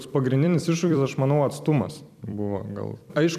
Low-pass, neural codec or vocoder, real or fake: 14.4 kHz; vocoder, 44.1 kHz, 128 mel bands every 256 samples, BigVGAN v2; fake